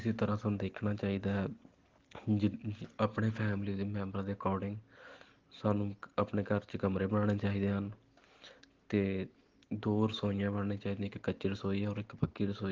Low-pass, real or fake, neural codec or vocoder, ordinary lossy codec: 7.2 kHz; real; none; Opus, 16 kbps